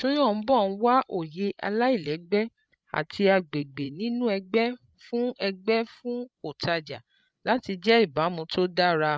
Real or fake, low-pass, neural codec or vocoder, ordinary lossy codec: fake; none; codec, 16 kHz, 16 kbps, FreqCodec, larger model; none